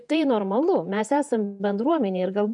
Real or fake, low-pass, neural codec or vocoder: fake; 10.8 kHz; vocoder, 48 kHz, 128 mel bands, Vocos